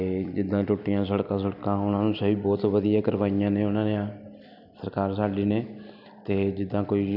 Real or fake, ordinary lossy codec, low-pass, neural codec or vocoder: real; none; 5.4 kHz; none